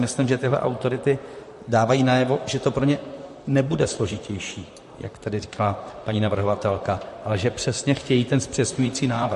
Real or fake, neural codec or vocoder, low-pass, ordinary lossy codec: fake; vocoder, 44.1 kHz, 128 mel bands, Pupu-Vocoder; 14.4 kHz; MP3, 48 kbps